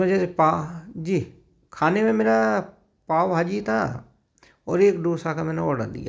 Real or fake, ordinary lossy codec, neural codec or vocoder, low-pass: real; none; none; none